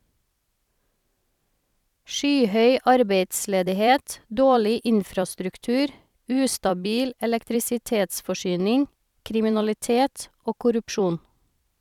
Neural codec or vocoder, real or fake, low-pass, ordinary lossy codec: vocoder, 44.1 kHz, 128 mel bands every 256 samples, BigVGAN v2; fake; 19.8 kHz; none